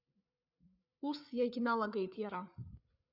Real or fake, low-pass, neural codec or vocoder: fake; 5.4 kHz; codec, 16 kHz, 8 kbps, FreqCodec, larger model